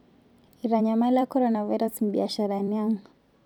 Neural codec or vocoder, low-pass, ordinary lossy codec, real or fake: vocoder, 44.1 kHz, 128 mel bands every 256 samples, BigVGAN v2; 19.8 kHz; none; fake